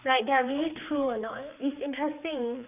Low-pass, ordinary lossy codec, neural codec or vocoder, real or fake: 3.6 kHz; none; codec, 16 kHz, 4 kbps, X-Codec, HuBERT features, trained on general audio; fake